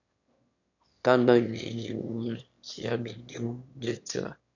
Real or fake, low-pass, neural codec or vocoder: fake; 7.2 kHz; autoencoder, 22.05 kHz, a latent of 192 numbers a frame, VITS, trained on one speaker